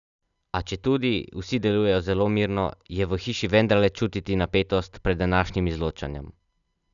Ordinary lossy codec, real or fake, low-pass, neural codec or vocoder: none; real; 7.2 kHz; none